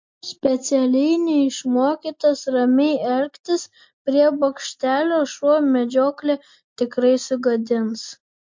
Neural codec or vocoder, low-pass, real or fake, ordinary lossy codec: none; 7.2 kHz; real; MP3, 48 kbps